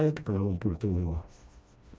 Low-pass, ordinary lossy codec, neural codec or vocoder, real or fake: none; none; codec, 16 kHz, 1 kbps, FreqCodec, smaller model; fake